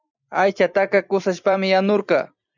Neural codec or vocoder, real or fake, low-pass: vocoder, 44.1 kHz, 128 mel bands every 256 samples, BigVGAN v2; fake; 7.2 kHz